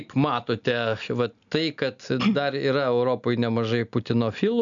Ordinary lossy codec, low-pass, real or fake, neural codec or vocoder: MP3, 96 kbps; 7.2 kHz; real; none